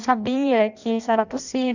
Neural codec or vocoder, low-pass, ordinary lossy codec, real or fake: codec, 16 kHz in and 24 kHz out, 0.6 kbps, FireRedTTS-2 codec; 7.2 kHz; none; fake